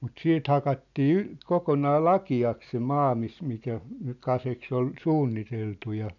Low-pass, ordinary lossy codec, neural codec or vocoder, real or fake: 7.2 kHz; none; none; real